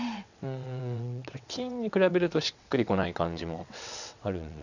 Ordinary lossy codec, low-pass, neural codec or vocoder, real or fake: none; 7.2 kHz; vocoder, 22.05 kHz, 80 mel bands, WaveNeXt; fake